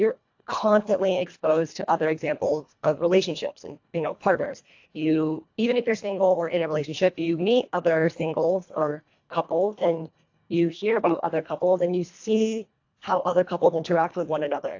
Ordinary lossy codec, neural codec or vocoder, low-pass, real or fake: AAC, 48 kbps; codec, 24 kHz, 1.5 kbps, HILCodec; 7.2 kHz; fake